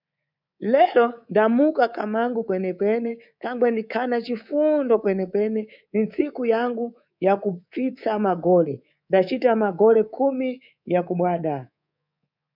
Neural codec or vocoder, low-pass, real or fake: codec, 24 kHz, 3.1 kbps, DualCodec; 5.4 kHz; fake